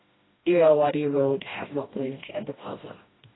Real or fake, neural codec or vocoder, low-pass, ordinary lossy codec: fake; codec, 16 kHz, 1 kbps, FreqCodec, smaller model; 7.2 kHz; AAC, 16 kbps